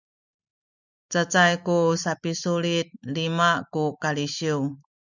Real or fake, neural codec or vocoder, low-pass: real; none; 7.2 kHz